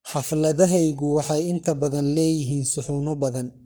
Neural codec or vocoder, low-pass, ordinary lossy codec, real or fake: codec, 44.1 kHz, 3.4 kbps, Pupu-Codec; none; none; fake